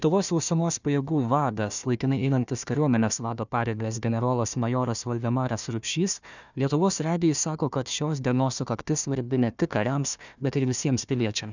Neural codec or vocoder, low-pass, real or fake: codec, 16 kHz, 1 kbps, FunCodec, trained on Chinese and English, 50 frames a second; 7.2 kHz; fake